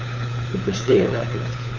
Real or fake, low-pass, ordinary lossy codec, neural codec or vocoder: fake; 7.2 kHz; none; codec, 16 kHz, 4 kbps, FunCodec, trained on LibriTTS, 50 frames a second